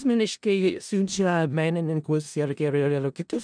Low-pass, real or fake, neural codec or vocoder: 9.9 kHz; fake; codec, 16 kHz in and 24 kHz out, 0.4 kbps, LongCat-Audio-Codec, four codebook decoder